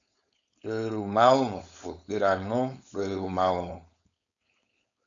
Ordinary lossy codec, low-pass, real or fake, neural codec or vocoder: MP3, 96 kbps; 7.2 kHz; fake; codec, 16 kHz, 4.8 kbps, FACodec